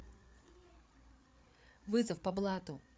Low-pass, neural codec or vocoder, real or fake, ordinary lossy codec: none; codec, 16 kHz, 8 kbps, FreqCodec, larger model; fake; none